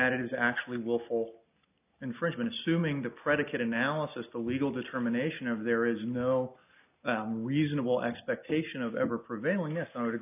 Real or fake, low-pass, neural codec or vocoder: real; 3.6 kHz; none